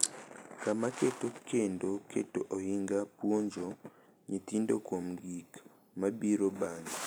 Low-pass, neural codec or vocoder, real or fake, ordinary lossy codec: none; none; real; none